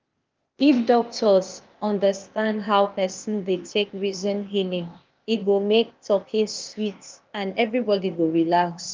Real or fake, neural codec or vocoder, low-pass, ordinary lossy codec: fake; codec, 16 kHz, 0.8 kbps, ZipCodec; 7.2 kHz; Opus, 32 kbps